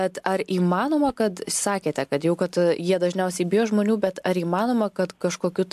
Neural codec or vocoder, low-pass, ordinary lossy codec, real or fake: none; 14.4 kHz; AAC, 96 kbps; real